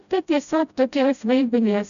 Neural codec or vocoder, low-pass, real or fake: codec, 16 kHz, 0.5 kbps, FreqCodec, smaller model; 7.2 kHz; fake